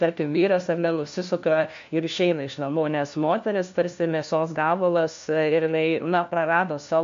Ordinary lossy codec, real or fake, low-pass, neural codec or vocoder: MP3, 48 kbps; fake; 7.2 kHz; codec, 16 kHz, 1 kbps, FunCodec, trained on LibriTTS, 50 frames a second